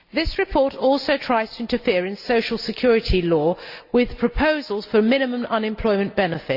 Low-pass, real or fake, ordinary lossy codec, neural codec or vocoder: 5.4 kHz; real; Opus, 64 kbps; none